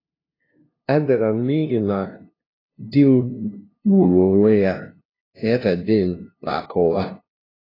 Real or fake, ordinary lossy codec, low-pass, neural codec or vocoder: fake; AAC, 24 kbps; 5.4 kHz; codec, 16 kHz, 0.5 kbps, FunCodec, trained on LibriTTS, 25 frames a second